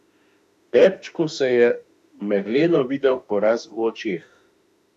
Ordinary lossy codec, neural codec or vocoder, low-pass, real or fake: none; autoencoder, 48 kHz, 32 numbers a frame, DAC-VAE, trained on Japanese speech; 14.4 kHz; fake